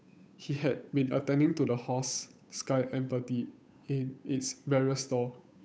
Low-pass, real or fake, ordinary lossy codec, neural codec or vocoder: none; fake; none; codec, 16 kHz, 8 kbps, FunCodec, trained on Chinese and English, 25 frames a second